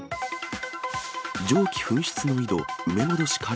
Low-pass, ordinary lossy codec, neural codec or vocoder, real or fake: none; none; none; real